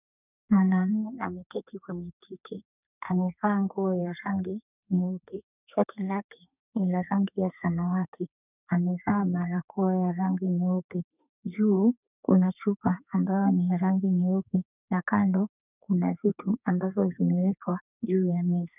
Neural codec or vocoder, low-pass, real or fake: codec, 32 kHz, 1.9 kbps, SNAC; 3.6 kHz; fake